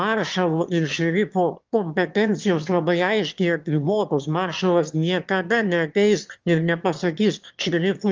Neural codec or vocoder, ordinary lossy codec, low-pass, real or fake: autoencoder, 22.05 kHz, a latent of 192 numbers a frame, VITS, trained on one speaker; Opus, 32 kbps; 7.2 kHz; fake